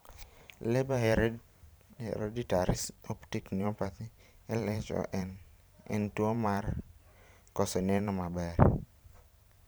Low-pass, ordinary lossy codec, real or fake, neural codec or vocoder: none; none; fake; vocoder, 44.1 kHz, 128 mel bands every 512 samples, BigVGAN v2